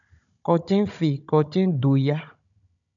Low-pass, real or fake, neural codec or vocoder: 7.2 kHz; fake; codec, 16 kHz, 16 kbps, FunCodec, trained on Chinese and English, 50 frames a second